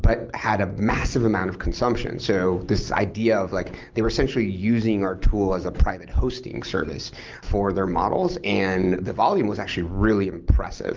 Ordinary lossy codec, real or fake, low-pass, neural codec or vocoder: Opus, 32 kbps; real; 7.2 kHz; none